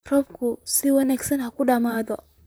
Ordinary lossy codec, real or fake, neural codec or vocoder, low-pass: none; fake; vocoder, 44.1 kHz, 128 mel bands, Pupu-Vocoder; none